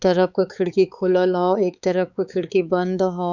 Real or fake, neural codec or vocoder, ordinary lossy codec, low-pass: fake; codec, 16 kHz, 4 kbps, X-Codec, HuBERT features, trained on balanced general audio; none; 7.2 kHz